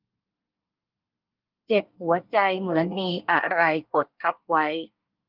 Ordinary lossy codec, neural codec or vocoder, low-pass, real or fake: Opus, 24 kbps; codec, 24 kHz, 1 kbps, SNAC; 5.4 kHz; fake